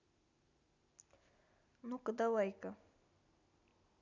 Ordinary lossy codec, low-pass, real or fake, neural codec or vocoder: none; 7.2 kHz; real; none